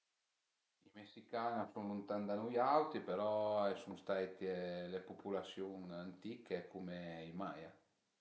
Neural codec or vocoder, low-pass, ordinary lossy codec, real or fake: none; none; none; real